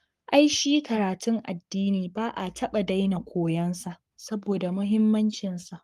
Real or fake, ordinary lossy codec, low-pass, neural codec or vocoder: fake; Opus, 32 kbps; 14.4 kHz; codec, 44.1 kHz, 7.8 kbps, Pupu-Codec